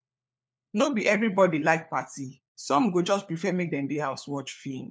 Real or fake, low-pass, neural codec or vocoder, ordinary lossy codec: fake; none; codec, 16 kHz, 4 kbps, FunCodec, trained on LibriTTS, 50 frames a second; none